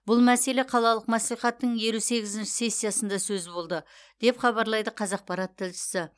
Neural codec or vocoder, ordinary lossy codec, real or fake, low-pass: none; none; real; none